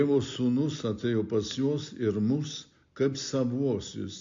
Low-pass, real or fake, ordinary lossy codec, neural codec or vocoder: 7.2 kHz; real; MP3, 48 kbps; none